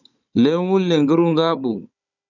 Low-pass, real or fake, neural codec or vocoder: 7.2 kHz; fake; codec, 16 kHz, 16 kbps, FunCodec, trained on Chinese and English, 50 frames a second